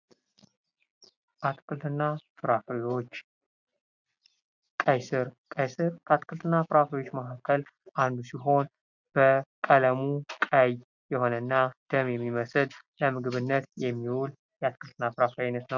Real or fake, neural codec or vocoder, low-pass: real; none; 7.2 kHz